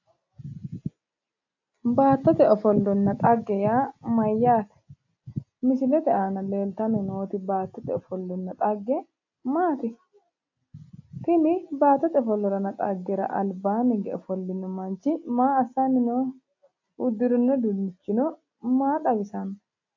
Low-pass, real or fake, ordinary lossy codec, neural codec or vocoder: 7.2 kHz; real; AAC, 48 kbps; none